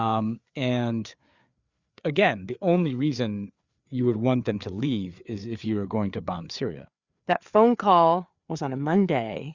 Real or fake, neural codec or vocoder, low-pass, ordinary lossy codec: fake; codec, 16 kHz, 4 kbps, FreqCodec, larger model; 7.2 kHz; Opus, 64 kbps